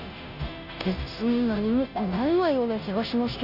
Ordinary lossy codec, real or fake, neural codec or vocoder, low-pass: none; fake; codec, 16 kHz, 0.5 kbps, FunCodec, trained on Chinese and English, 25 frames a second; 5.4 kHz